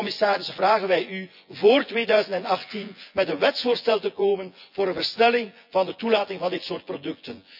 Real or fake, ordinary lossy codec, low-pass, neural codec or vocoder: fake; none; 5.4 kHz; vocoder, 24 kHz, 100 mel bands, Vocos